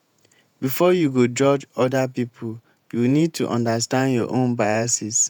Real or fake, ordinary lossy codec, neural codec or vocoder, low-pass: fake; none; vocoder, 48 kHz, 128 mel bands, Vocos; none